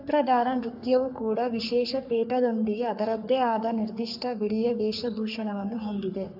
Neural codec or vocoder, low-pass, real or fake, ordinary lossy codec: codec, 44.1 kHz, 3.4 kbps, Pupu-Codec; 5.4 kHz; fake; AAC, 48 kbps